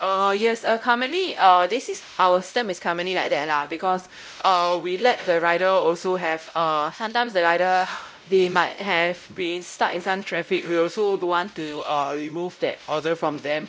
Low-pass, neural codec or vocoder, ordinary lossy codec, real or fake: none; codec, 16 kHz, 0.5 kbps, X-Codec, WavLM features, trained on Multilingual LibriSpeech; none; fake